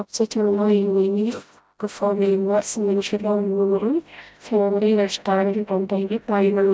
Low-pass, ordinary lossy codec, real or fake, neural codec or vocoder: none; none; fake; codec, 16 kHz, 0.5 kbps, FreqCodec, smaller model